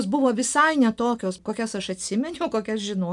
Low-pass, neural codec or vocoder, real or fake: 10.8 kHz; none; real